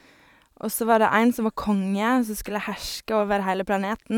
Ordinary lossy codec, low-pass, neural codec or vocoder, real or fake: none; 19.8 kHz; vocoder, 44.1 kHz, 128 mel bands every 512 samples, BigVGAN v2; fake